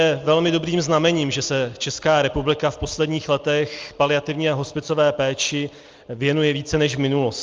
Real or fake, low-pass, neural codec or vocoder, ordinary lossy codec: real; 7.2 kHz; none; Opus, 32 kbps